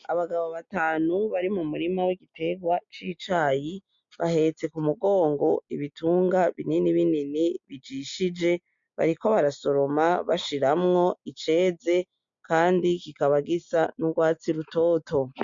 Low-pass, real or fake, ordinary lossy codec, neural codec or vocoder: 7.2 kHz; real; MP3, 48 kbps; none